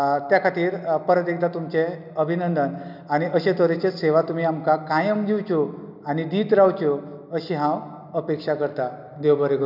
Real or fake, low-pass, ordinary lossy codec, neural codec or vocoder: real; 5.4 kHz; none; none